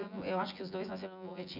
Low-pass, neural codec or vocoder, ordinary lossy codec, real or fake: 5.4 kHz; vocoder, 24 kHz, 100 mel bands, Vocos; none; fake